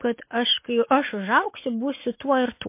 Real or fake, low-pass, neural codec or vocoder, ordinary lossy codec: real; 3.6 kHz; none; MP3, 24 kbps